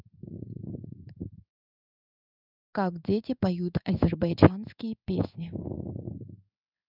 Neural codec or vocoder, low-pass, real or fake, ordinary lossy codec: codec, 16 kHz in and 24 kHz out, 1 kbps, XY-Tokenizer; 5.4 kHz; fake; none